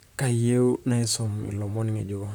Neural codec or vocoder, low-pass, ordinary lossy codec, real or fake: vocoder, 44.1 kHz, 128 mel bands, Pupu-Vocoder; none; none; fake